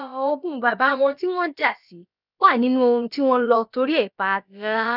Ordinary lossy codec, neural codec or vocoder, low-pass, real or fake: none; codec, 16 kHz, about 1 kbps, DyCAST, with the encoder's durations; 5.4 kHz; fake